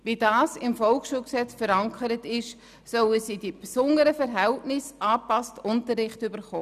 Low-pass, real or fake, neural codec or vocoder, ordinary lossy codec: 14.4 kHz; real; none; none